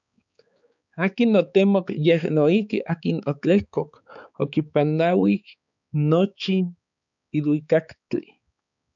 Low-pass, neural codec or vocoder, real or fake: 7.2 kHz; codec, 16 kHz, 4 kbps, X-Codec, HuBERT features, trained on balanced general audio; fake